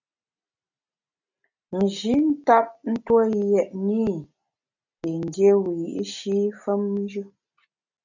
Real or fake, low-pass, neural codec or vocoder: real; 7.2 kHz; none